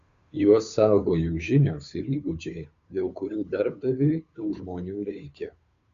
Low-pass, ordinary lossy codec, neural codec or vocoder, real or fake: 7.2 kHz; Opus, 64 kbps; codec, 16 kHz, 2 kbps, FunCodec, trained on Chinese and English, 25 frames a second; fake